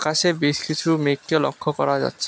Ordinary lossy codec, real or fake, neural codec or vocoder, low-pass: none; real; none; none